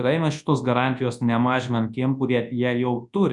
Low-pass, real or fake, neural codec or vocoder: 10.8 kHz; fake; codec, 24 kHz, 0.9 kbps, WavTokenizer, large speech release